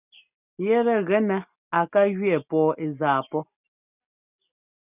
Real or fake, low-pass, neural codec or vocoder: real; 3.6 kHz; none